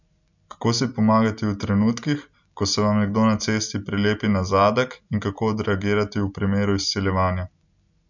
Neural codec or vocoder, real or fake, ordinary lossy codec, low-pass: none; real; none; 7.2 kHz